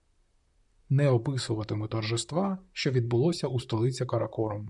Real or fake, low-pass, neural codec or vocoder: fake; 10.8 kHz; vocoder, 44.1 kHz, 128 mel bands, Pupu-Vocoder